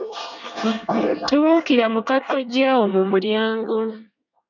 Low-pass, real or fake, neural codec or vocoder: 7.2 kHz; fake; codec, 24 kHz, 1 kbps, SNAC